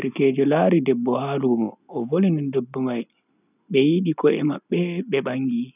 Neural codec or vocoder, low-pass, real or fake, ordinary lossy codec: none; 3.6 kHz; real; none